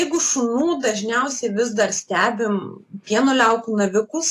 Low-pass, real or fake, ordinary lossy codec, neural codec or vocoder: 14.4 kHz; real; AAC, 48 kbps; none